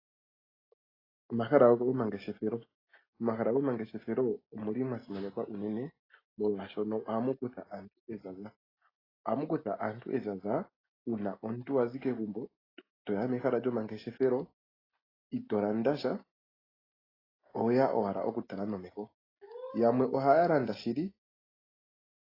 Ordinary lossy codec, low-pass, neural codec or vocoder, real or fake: AAC, 24 kbps; 5.4 kHz; none; real